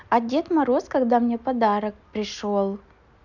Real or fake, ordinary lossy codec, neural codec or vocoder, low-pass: real; none; none; 7.2 kHz